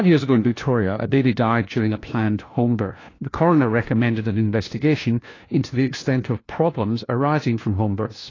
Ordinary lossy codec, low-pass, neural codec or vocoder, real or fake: AAC, 32 kbps; 7.2 kHz; codec, 16 kHz, 1 kbps, FunCodec, trained on LibriTTS, 50 frames a second; fake